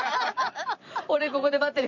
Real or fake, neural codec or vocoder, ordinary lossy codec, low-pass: fake; vocoder, 44.1 kHz, 128 mel bands every 512 samples, BigVGAN v2; none; 7.2 kHz